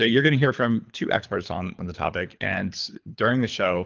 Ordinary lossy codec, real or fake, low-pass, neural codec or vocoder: Opus, 24 kbps; fake; 7.2 kHz; codec, 24 kHz, 3 kbps, HILCodec